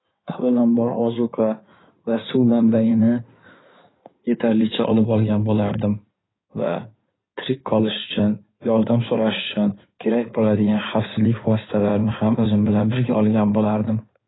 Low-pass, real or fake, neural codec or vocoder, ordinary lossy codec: 7.2 kHz; fake; codec, 16 kHz in and 24 kHz out, 2.2 kbps, FireRedTTS-2 codec; AAC, 16 kbps